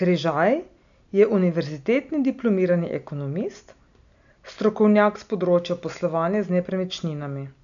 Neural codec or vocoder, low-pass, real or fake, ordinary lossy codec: none; 7.2 kHz; real; none